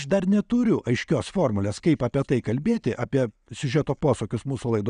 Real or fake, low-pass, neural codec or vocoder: real; 9.9 kHz; none